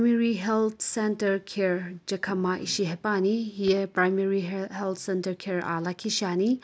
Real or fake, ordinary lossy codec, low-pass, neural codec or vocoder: real; none; none; none